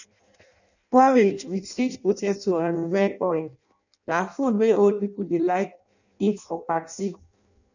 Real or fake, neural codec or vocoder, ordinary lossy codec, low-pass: fake; codec, 16 kHz in and 24 kHz out, 0.6 kbps, FireRedTTS-2 codec; none; 7.2 kHz